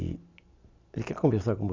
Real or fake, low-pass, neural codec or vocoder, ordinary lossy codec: real; 7.2 kHz; none; MP3, 64 kbps